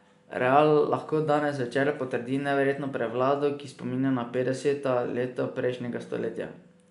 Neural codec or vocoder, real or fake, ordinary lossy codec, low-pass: none; real; MP3, 96 kbps; 10.8 kHz